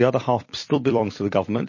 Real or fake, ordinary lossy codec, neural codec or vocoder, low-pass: fake; MP3, 32 kbps; vocoder, 44.1 kHz, 128 mel bands every 256 samples, BigVGAN v2; 7.2 kHz